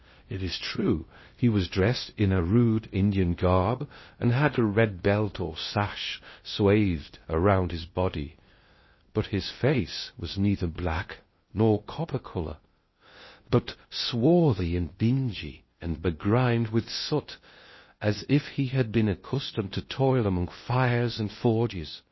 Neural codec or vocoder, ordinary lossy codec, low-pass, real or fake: codec, 16 kHz in and 24 kHz out, 0.6 kbps, FocalCodec, streaming, 2048 codes; MP3, 24 kbps; 7.2 kHz; fake